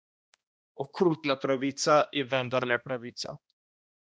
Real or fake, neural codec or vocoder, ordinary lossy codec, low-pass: fake; codec, 16 kHz, 1 kbps, X-Codec, HuBERT features, trained on balanced general audio; none; none